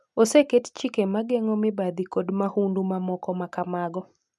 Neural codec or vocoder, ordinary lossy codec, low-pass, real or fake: none; none; none; real